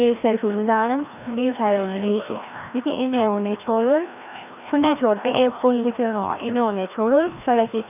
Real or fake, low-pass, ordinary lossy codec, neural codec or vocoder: fake; 3.6 kHz; none; codec, 16 kHz, 1 kbps, FreqCodec, larger model